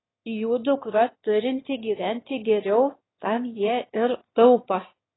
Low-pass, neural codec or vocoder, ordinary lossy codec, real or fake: 7.2 kHz; autoencoder, 22.05 kHz, a latent of 192 numbers a frame, VITS, trained on one speaker; AAC, 16 kbps; fake